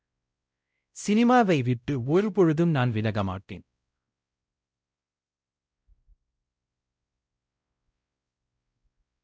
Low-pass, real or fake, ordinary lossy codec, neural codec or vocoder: none; fake; none; codec, 16 kHz, 0.5 kbps, X-Codec, WavLM features, trained on Multilingual LibriSpeech